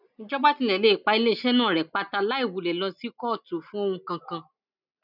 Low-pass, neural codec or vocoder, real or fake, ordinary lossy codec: 5.4 kHz; none; real; none